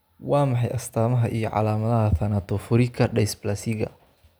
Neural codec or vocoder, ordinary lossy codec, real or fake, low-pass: none; none; real; none